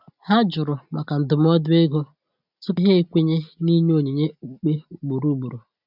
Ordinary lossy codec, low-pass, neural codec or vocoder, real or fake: none; 5.4 kHz; none; real